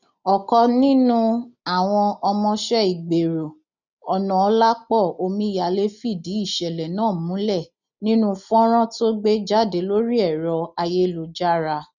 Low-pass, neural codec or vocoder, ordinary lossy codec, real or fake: 7.2 kHz; none; none; real